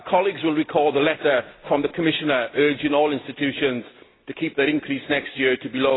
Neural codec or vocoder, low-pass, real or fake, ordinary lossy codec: none; 7.2 kHz; real; AAC, 16 kbps